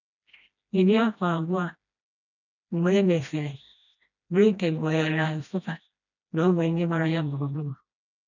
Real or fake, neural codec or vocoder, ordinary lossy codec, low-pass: fake; codec, 16 kHz, 1 kbps, FreqCodec, smaller model; none; 7.2 kHz